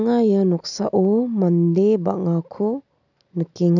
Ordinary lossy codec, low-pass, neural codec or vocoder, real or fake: none; 7.2 kHz; none; real